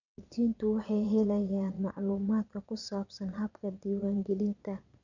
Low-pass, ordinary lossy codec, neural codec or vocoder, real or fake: 7.2 kHz; none; vocoder, 22.05 kHz, 80 mel bands, Vocos; fake